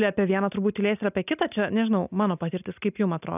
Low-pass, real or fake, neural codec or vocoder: 3.6 kHz; real; none